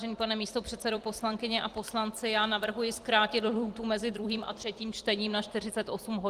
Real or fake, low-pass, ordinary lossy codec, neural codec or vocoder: fake; 14.4 kHz; Opus, 24 kbps; vocoder, 44.1 kHz, 128 mel bands every 256 samples, BigVGAN v2